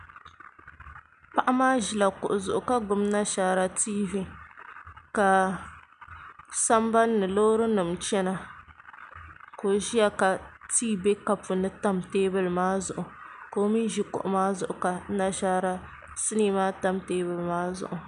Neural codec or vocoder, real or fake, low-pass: none; real; 10.8 kHz